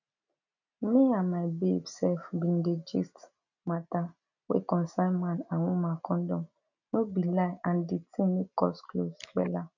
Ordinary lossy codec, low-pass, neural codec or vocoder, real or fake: none; 7.2 kHz; none; real